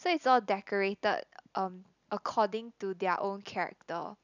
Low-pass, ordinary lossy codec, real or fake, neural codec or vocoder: 7.2 kHz; none; real; none